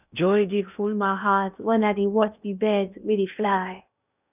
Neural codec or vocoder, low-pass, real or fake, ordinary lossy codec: codec, 16 kHz in and 24 kHz out, 0.6 kbps, FocalCodec, streaming, 2048 codes; 3.6 kHz; fake; none